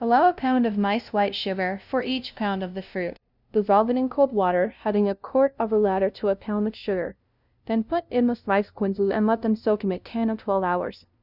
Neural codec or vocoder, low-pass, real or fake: codec, 16 kHz, 0.5 kbps, FunCodec, trained on LibriTTS, 25 frames a second; 5.4 kHz; fake